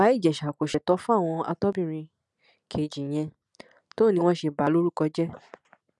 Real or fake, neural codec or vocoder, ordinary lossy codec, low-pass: real; none; none; none